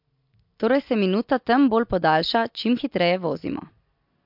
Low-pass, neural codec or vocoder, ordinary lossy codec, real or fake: 5.4 kHz; none; MP3, 48 kbps; real